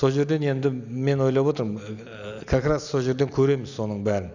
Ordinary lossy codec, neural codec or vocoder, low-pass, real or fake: none; none; 7.2 kHz; real